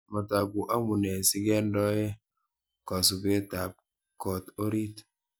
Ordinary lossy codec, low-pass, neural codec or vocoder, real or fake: none; none; none; real